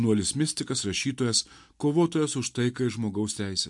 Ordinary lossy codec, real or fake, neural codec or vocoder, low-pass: MP3, 64 kbps; real; none; 10.8 kHz